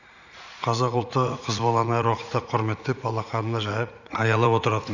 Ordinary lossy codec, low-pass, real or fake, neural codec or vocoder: none; 7.2 kHz; fake; vocoder, 44.1 kHz, 80 mel bands, Vocos